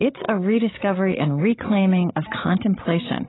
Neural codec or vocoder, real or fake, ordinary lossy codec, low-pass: codec, 16 kHz, 16 kbps, FreqCodec, larger model; fake; AAC, 16 kbps; 7.2 kHz